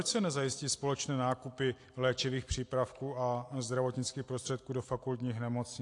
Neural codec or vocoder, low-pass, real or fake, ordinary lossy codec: none; 10.8 kHz; real; AAC, 48 kbps